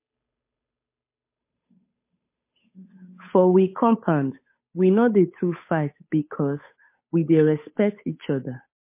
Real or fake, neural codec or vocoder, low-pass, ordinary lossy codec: fake; codec, 16 kHz, 8 kbps, FunCodec, trained on Chinese and English, 25 frames a second; 3.6 kHz; MP3, 24 kbps